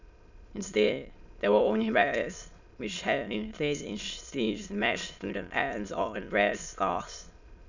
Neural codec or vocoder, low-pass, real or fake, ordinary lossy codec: autoencoder, 22.05 kHz, a latent of 192 numbers a frame, VITS, trained on many speakers; 7.2 kHz; fake; none